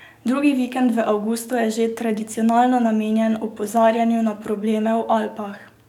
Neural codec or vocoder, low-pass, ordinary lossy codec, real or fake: codec, 44.1 kHz, 7.8 kbps, DAC; 19.8 kHz; none; fake